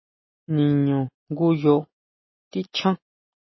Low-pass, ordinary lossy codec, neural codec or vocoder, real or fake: 7.2 kHz; MP3, 24 kbps; none; real